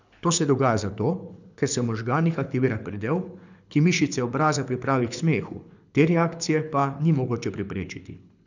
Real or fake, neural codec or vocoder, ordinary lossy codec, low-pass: fake; codec, 24 kHz, 6 kbps, HILCodec; none; 7.2 kHz